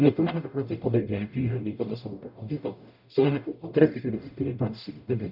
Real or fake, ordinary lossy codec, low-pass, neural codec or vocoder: fake; none; 5.4 kHz; codec, 44.1 kHz, 0.9 kbps, DAC